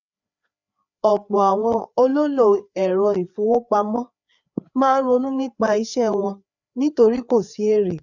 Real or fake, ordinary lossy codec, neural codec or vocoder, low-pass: fake; none; codec, 16 kHz, 4 kbps, FreqCodec, larger model; 7.2 kHz